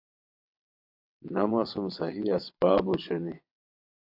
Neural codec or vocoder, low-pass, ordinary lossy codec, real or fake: vocoder, 22.05 kHz, 80 mel bands, WaveNeXt; 5.4 kHz; AAC, 48 kbps; fake